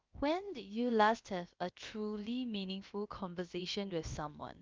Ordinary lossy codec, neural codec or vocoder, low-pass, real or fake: Opus, 24 kbps; codec, 16 kHz, about 1 kbps, DyCAST, with the encoder's durations; 7.2 kHz; fake